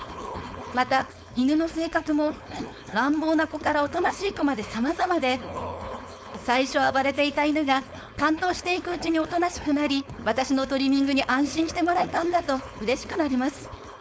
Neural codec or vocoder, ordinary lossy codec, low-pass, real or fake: codec, 16 kHz, 4.8 kbps, FACodec; none; none; fake